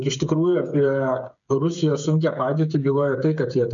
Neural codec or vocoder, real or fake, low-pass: codec, 16 kHz, 8 kbps, FreqCodec, smaller model; fake; 7.2 kHz